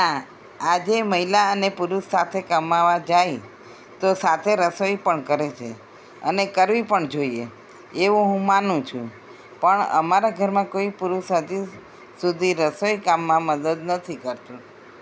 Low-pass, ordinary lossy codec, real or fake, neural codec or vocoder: none; none; real; none